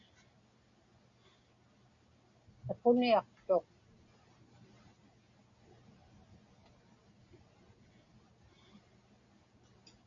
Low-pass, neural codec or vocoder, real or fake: 7.2 kHz; none; real